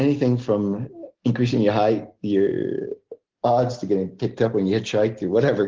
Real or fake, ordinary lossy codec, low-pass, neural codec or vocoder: real; Opus, 16 kbps; 7.2 kHz; none